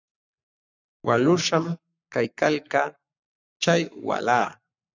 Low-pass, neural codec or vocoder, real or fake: 7.2 kHz; codec, 44.1 kHz, 7.8 kbps, Pupu-Codec; fake